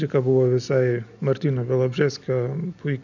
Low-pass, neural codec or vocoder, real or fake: 7.2 kHz; none; real